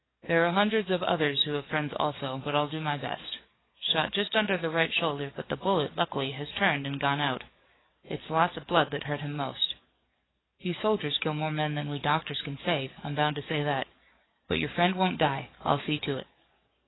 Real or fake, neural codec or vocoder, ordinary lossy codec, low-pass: fake; codec, 44.1 kHz, 7.8 kbps, DAC; AAC, 16 kbps; 7.2 kHz